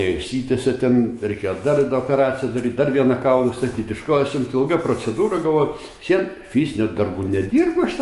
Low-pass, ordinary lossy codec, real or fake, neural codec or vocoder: 14.4 kHz; MP3, 48 kbps; fake; autoencoder, 48 kHz, 128 numbers a frame, DAC-VAE, trained on Japanese speech